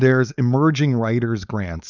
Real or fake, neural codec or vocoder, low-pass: fake; codec, 16 kHz, 4.8 kbps, FACodec; 7.2 kHz